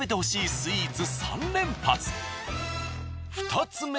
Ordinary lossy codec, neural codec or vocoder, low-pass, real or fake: none; none; none; real